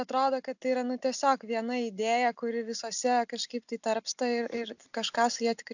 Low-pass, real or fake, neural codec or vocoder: 7.2 kHz; real; none